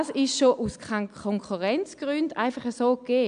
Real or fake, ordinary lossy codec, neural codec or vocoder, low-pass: real; AAC, 96 kbps; none; 9.9 kHz